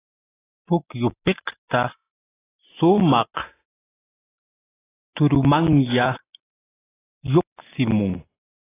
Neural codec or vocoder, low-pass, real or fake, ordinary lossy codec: none; 3.6 kHz; real; AAC, 16 kbps